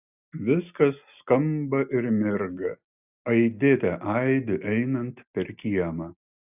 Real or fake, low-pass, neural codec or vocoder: real; 3.6 kHz; none